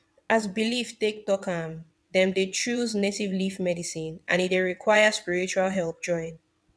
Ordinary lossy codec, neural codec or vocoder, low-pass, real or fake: none; vocoder, 22.05 kHz, 80 mel bands, WaveNeXt; none; fake